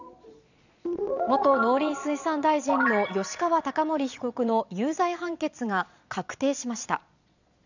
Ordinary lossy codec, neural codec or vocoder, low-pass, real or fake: none; vocoder, 22.05 kHz, 80 mel bands, Vocos; 7.2 kHz; fake